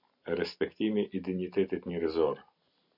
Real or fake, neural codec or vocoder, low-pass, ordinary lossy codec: real; none; 5.4 kHz; MP3, 48 kbps